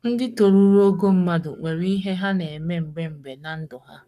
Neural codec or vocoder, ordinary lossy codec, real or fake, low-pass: codec, 44.1 kHz, 7.8 kbps, Pupu-Codec; none; fake; 14.4 kHz